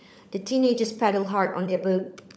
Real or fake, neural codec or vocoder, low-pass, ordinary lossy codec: fake; codec, 16 kHz, 16 kbps, FunCodec, trained on LibriTTS, 50 frames a second; none; none